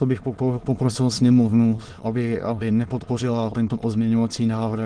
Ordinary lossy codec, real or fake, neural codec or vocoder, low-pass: Opus, 16 kbps; fake; autoencoder, 22.05 kHz, a latent of 192 numbers a frame, VITS, trained on many speakers; 9.9 kHz